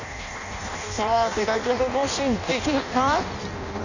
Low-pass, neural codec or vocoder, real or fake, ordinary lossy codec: 7.2 kHz; codec, 16 kHz in and 24 kHz out, 0.6 kbps, FireRedTTS-2 codec; fake; none